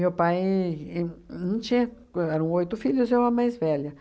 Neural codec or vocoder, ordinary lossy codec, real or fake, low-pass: none; none; real; none